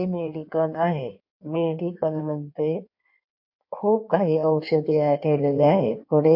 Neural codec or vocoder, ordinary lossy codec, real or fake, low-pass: codec, 16 kHz in and 24 kHz out, 1.1 kbps, FireRedTTS-2 codec; MP3, 32 kbps; fake; 5.4 kHz